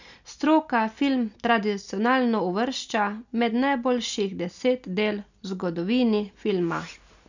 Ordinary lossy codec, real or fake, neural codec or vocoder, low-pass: none; real; none; 7.2 kHz